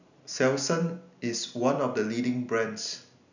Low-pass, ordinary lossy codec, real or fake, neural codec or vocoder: 7.2 kHz; none; real; none